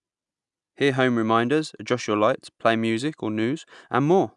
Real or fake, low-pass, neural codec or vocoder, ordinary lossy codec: real; 10.8 kHz; none; none